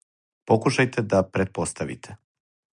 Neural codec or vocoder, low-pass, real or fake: none; 9.9 kHz; real